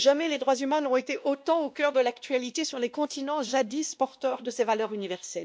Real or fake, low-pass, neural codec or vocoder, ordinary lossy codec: fake; none; codec, 16 kHz, 1 kbps, X-Codec, WavLM features, trained on Multilingual LibriSpeech; none